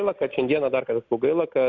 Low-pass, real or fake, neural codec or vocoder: 7.2 kHz; real; none